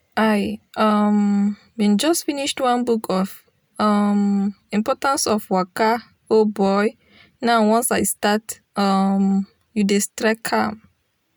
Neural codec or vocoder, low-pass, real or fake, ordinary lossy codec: none; none; real; none